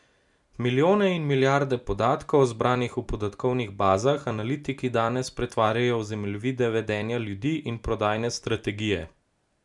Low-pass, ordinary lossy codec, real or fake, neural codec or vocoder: 10.8 kHz; MP3, 96 kbps; real; none